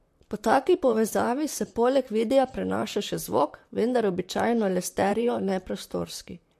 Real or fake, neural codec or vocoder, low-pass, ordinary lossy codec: fake; vocoder, 44.1 kHz, 128 mel bands, Pupu-Vocoder; 14.4 kHz; MP3, 64 kbps